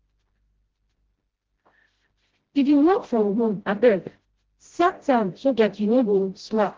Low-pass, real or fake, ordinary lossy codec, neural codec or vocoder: 7.2 kHz; fake; Opus, 16 kbps; codec, 16 kHz, 0.5 kbps, FreqCodec, smaller model